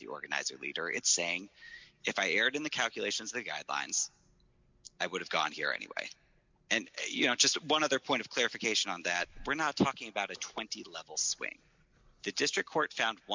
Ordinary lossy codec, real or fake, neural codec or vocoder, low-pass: MP3, 64 kbps; real; none; 7.2 kHz